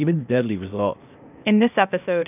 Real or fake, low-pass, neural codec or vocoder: fake; 3.6 kHz; codec, 16 kHz, 0.8 kbps, ZipCodec